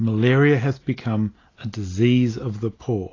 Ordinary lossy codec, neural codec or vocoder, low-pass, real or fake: AAC, 32 kbps; none; 7.2 kHz; real